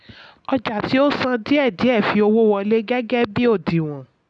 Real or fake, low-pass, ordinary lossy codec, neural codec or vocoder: real; 9.9 kHz; none; none